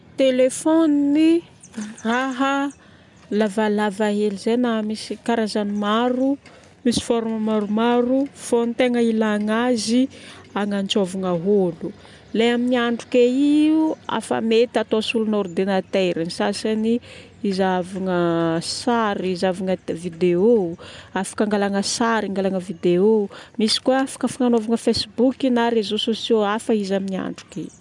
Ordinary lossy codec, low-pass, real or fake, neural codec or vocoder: none; 10.8 kHz; real; none